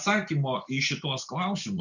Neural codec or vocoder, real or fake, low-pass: none; real; 7.2 kHz